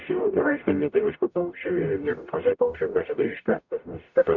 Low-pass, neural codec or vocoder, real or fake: 7.2 kHz; codec, 44.1 kHz, 0.9 kbps, DAC; fake